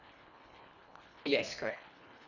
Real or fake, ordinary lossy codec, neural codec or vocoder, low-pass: fake; none; codec, 24 kHz, 1.5 kbps, HILCodec; 7.2 kHz